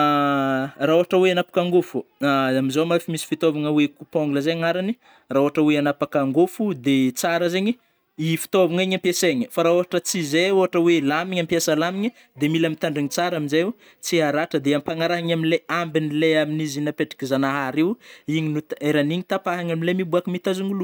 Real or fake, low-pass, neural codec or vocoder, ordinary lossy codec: real; none; none; none